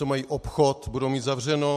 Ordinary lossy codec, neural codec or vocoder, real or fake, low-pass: MP3, 64 kbps; none; real; 14.4 kHz